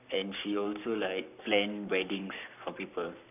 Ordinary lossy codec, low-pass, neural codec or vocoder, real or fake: none; 3.6 kHz; codec, 44.1 kHz, 7.8 kbps, Pupu-Codec; fake